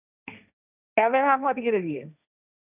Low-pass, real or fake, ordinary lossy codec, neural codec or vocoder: 3.6 kHz; fake; none; codec, 16 kHz, 1.1 kbps, Voila-Tokenizer